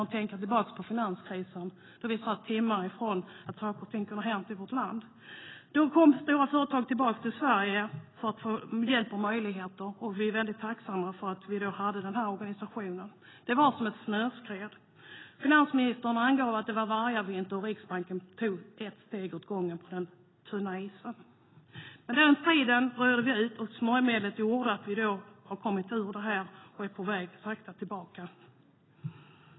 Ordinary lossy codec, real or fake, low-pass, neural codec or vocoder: AAC, 16 kbps; real; 7.2 kHz; none